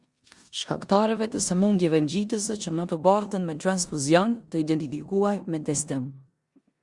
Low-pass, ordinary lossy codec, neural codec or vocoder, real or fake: 10.8 kHz; Opus, 64 kbps; codec, 16 kHz in and 24 kHz out, 0.9 kbps, LongCat-Audio-Codec, four codebook decoder; fake